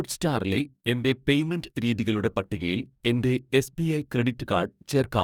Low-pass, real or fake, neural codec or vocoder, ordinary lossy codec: 19.8 kHz; fake; codec, 44.1 kHz, 2.6 kbps, DAC; none